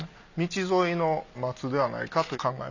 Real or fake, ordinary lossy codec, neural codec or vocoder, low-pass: real; none; none; 7.2 kHz